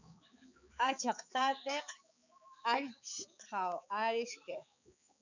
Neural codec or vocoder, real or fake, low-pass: codec, 16 kHz, 4 kbps, X-Codec, HuBERT features, trained on balanced general audio; fake; 7.2 kHz